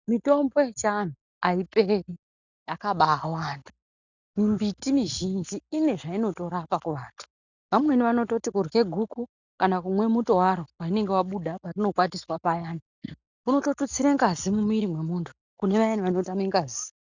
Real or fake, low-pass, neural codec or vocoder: real; 7.2 kHz; none